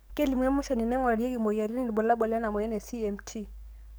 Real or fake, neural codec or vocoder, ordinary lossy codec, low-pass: fake; codec, 44.1 kHz, 7.8 kbps, DAC; none; none